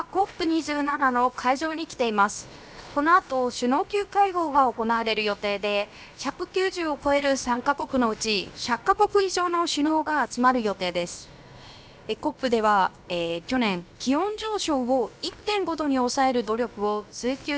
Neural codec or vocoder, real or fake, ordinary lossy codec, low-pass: codec, 16 kHz, about 1 kbps, DyCAST, with the encoder's durations; fake; none; none